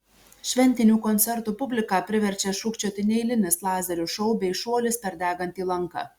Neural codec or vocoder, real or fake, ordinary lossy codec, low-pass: none; real; Opus, 64 kbps; 19.8 kHz